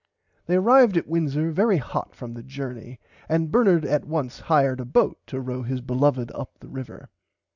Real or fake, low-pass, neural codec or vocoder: real; 7.2 kHz; none